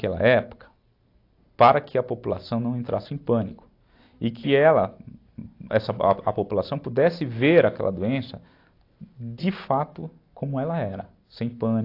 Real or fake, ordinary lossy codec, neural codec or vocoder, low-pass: real; AAC, 32 kbps; none; 5.4 kHz